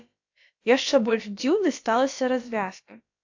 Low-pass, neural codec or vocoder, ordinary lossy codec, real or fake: 7.2 kHz; codec, 16 kHz, about 1 kbps, DyCAST, with the encoder's durations; AAC, 48 kbps; fake